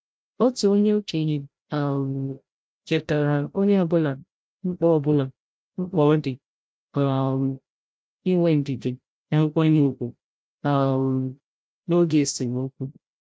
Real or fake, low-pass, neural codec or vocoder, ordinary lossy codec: fake; none; codec, 16 kHz, 0.5 kbps, FreqCodec, larger model; none